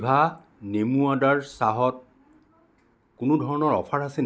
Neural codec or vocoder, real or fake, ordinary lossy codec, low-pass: none; real; none; none